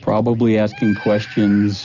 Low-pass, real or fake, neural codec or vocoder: 7.2 kHz; real; none